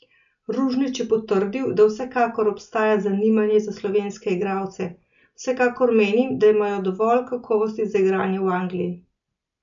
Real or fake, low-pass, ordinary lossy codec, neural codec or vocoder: real; 7.2 kHz; none; none